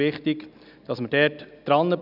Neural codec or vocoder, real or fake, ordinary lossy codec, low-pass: none; real; none; 5.4 kHz